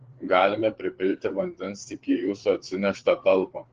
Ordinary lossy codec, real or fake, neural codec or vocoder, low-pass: Opus, 16 kbps; fake; codec, 16 kHz, 4 kbps, FreqCodec, larger model; 7.2 kHz